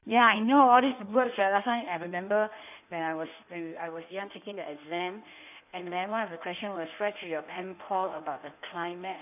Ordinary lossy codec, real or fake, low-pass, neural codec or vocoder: none; fake; 3.6 kHz; codec, 16 kHz in and 24 kHz out, 1.1 kbps, FireRedTTS-2 codec